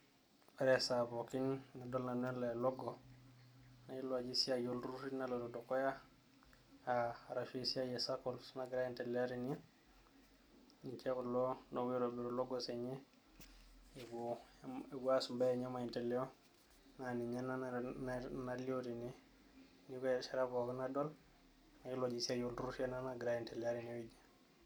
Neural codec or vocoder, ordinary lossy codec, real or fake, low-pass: none; none; real; none